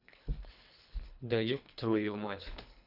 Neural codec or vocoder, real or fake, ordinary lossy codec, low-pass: codec, 24 kHz, 3 kbps, HILCodec; fake; none; 5.4 kHz